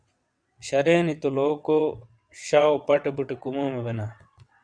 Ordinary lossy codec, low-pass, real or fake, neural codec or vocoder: MP3, 96 kbps; 9.9 kHz; fake; vocoder, 22.05 kHz, 80 mel bands, WaveNeXt